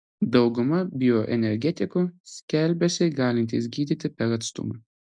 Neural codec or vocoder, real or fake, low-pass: none; real; 9.9 kHz